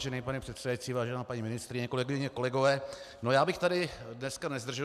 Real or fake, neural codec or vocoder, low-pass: real; none; 14.4 kHz